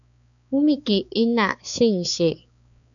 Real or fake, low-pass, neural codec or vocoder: fake; 7.2 kHz; codec, 16 kHz, 4 kbps, X-Codec, HuBERT features, trained on balanced general audio